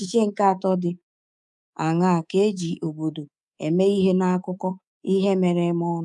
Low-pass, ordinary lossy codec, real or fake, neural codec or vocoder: none; none; fake; codec, 24 kHz, 3.1 kbps, DualCodec